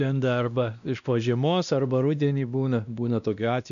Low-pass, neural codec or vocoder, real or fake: 7.2 kHz; codec, 16 kHz, 1 kbps, X-Codec, WavLM features, trained on Multilingual LibriSpeech; fake